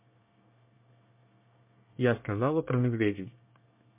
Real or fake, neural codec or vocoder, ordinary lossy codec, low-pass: fake; codec, 24 kHz, 1 kbps, SNAC; MP3, 32 kbps; 3.6 kHz